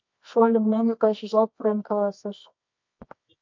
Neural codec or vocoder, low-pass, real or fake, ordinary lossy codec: codec, 24 kHz, 0.9 kbps, WavTokenizer, medium music audio release; 7.2 kHz; fake; MP3, 48 kbps